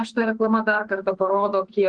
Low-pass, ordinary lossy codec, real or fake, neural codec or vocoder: 9.9 kHz; Opus, 16 kbps; fake; autoencoder, 48 kHz, 32 numbers a frame, DAC-VAE, trained on Japanese speech